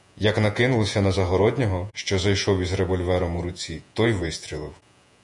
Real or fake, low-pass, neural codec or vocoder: fake; 10.8 kHz; vocoder, 48 kHz, 128 mel bands, Vocos